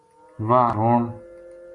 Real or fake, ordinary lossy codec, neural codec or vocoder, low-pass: real; AAC, 64 kbps; none; 10.8 kHz